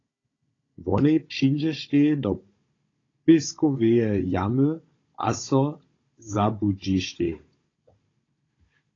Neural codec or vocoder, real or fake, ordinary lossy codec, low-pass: codec, 16 kHz, 16 kbps, FunCodec, trained on Chinese and English, 50 frames a second; fake; AAC, 32 kbps; 7.2 kHz